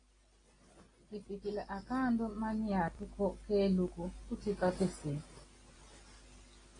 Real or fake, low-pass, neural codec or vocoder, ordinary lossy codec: real; 9.9 kHz; none; AAC, 32 kbps